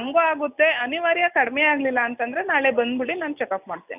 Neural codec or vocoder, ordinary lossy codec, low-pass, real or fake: none; none; 3.6 kHz; real